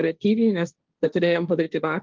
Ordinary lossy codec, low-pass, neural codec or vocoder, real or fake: Opus, 32 kbps; 7.2 kHz; codec, 16 kHz, 1.1 kbps, Voila-Tokenizer; fake